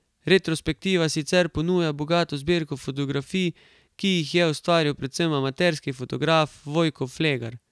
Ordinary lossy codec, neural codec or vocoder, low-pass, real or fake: none; none; none; real